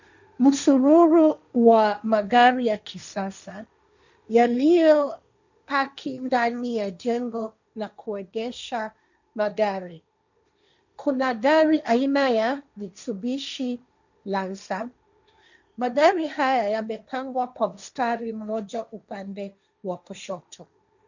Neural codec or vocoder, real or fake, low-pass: codec, 16 kHz, 1.1 kbps, Voila-Tokenizer; fake; 7.2 kHz